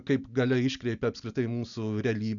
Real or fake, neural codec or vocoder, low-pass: real; none; 7.2 kHz